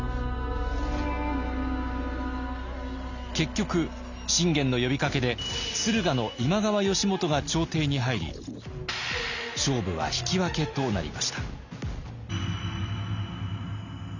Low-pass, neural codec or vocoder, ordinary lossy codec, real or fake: 7.2 kHz; none; none; real